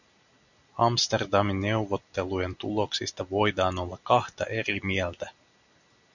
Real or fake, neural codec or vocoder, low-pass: real; none; 7.2 kHz